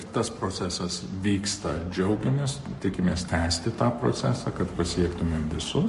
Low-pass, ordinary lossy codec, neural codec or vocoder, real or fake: 14.4 kHz; MP3, 48 kbps; codec, 44.1 kHz, 7.8 kbps, Pupu-Codec; fake